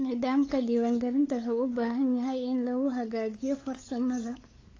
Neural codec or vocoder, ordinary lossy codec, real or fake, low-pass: codec, 16 kHz, 8 kbps, FunCodec, trained on LibriTTS, 25 frames a second; AAC, 32 kbps; fake; 7.2 kHz